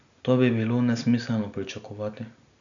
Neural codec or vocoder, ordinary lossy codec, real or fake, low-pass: none; none; real; 7.2 kHz